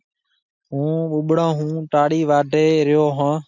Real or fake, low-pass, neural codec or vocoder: real; 7.2 kHz; none